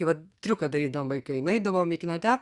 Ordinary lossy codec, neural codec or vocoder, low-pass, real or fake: Opus, 64 kbps; codec, 44.1 kHz, 2.6 kbps, SNAC; 10.8 kHz; fake